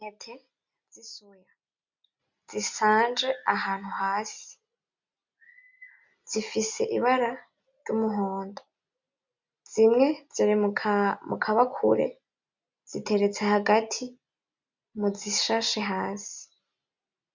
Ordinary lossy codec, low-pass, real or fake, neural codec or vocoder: MP3, 64 kbps; 7.2 kHz; real; none